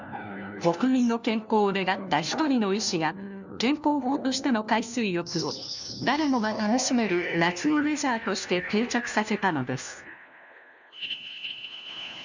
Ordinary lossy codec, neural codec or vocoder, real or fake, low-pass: none; codec, 16 kHz, 1 kbps, FunCodec, trained on LibriTTS, 50 frames a second; fake; 7.2 kHz